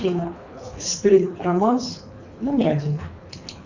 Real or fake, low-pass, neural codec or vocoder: fake; 7.2 kHz; codec, 24 kHz, 3 kbps, HILCodec